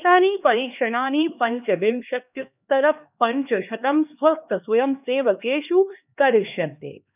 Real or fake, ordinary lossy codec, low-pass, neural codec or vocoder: fake; none; 3.6 kHz; codec, 16 kHz, 2 kbps, X-Codec, HuBERT features, trained on LibriSpeech